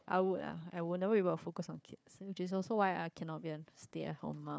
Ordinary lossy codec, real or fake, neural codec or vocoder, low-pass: none; fake; codec, 16 kHz, 4 kbps, FunCodec, trained on Chinese and English, 50 frames a second; none